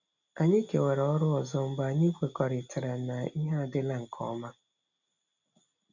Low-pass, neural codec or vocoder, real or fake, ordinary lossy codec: 7.2 kHz; none; real; none